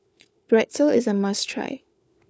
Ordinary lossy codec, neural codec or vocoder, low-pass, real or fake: none; codec, 16 kHz, 4 kbps, FunCodec, trained on LibriTTS, 50 frames a second; none; fake